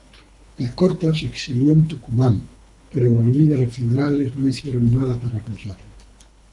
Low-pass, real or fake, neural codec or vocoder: 10.8 kHz; fake; codec, 24 kHz, 3 kbps, HILCodec